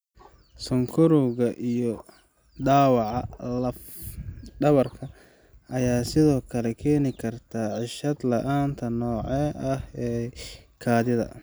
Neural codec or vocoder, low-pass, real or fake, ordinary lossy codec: none; none; real; none